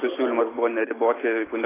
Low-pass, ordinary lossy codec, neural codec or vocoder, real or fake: 3.6 kHz; AAC, 16 kbps; none; real